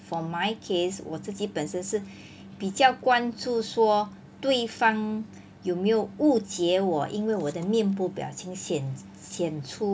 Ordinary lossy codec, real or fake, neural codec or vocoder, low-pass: none; real; none; none